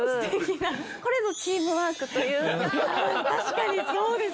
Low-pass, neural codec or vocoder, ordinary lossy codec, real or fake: none; none; none; real